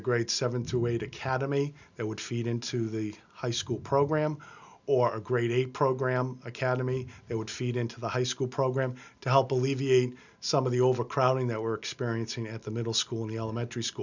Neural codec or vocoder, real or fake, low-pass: none; real; 7.2 kHz